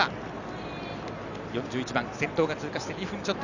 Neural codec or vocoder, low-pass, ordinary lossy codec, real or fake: none; 7.2 kHz; none; real